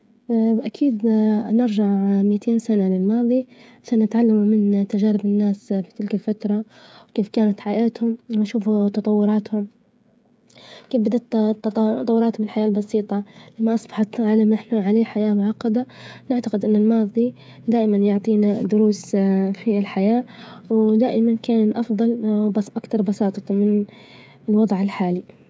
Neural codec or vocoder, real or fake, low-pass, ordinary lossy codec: codec, 16 kHz, 16 kbps, FreqCodec, smaller model; fake; none; none